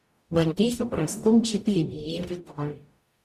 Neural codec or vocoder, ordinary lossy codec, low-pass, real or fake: codec, 44.1 kHz, 0.9 kbps, DAC; Opus, 64 kbps; 14.4 kHz; fake